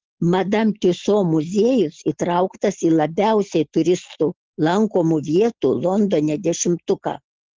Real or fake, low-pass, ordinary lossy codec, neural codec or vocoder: real; 7.2 kHz; Opus, 16 kbps; none